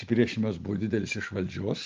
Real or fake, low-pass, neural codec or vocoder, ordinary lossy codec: real; 7.2 kHz; none; Opus, 32 kbps